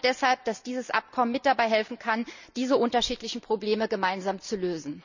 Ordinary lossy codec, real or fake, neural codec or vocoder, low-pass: none; real; none; 7.2 kHz